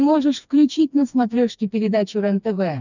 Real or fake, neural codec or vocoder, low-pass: fake; codec, 16 kHz, 2 kbps, FreqCodec, smaller model; 7.2 kHz